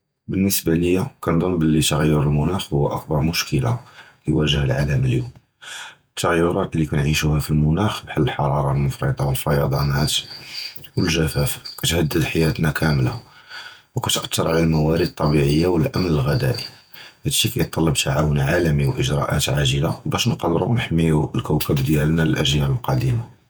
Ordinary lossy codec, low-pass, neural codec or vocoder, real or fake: none; none; vocoder, 48 kHz, 128 mel bands, Vocos; fake